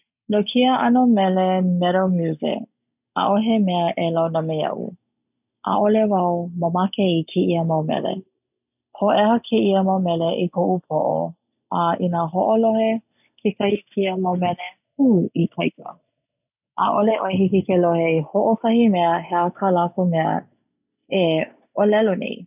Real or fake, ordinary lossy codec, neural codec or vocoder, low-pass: real; none; none; 3.6 kHz